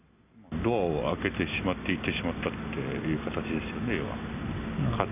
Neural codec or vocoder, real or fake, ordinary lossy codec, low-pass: none; real; none; 3.6 kHz